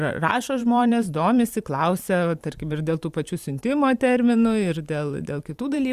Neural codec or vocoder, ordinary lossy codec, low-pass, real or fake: vocoder, 44.1 kHz, 128 mel bands every 512 samples, BigVGAN v2; Opus, 64 kbps; 14.4 kHz; fake